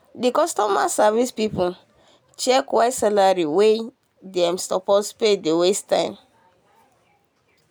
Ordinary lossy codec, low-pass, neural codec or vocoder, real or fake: none; none; none; real